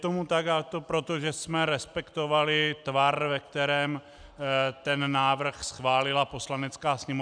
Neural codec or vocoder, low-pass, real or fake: none; 9.9 kHz; real